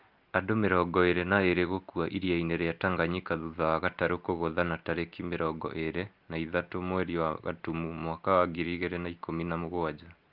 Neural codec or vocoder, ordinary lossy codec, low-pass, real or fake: none; Opus, 24 kbps; 5.4 kHz; real